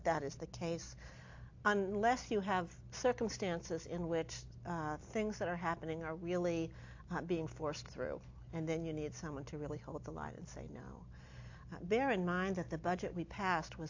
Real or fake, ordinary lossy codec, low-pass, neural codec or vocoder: real; AAC, 48 kbps; 7.2 kHz; none